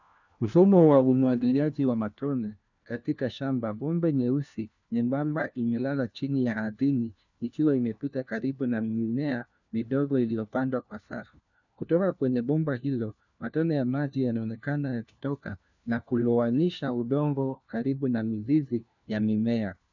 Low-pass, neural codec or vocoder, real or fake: 7.2 kHz; codec, 16 kHz, 1 kbps, FunCodec, trained on LibriTTS, 50 frames a second; fake